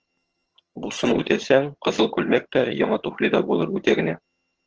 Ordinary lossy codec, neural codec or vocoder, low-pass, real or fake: Opus, 16 kbps; vocoder, 22.05 kHz, 80 mel bands, HiFi-GAN; 7.2 kHz; fake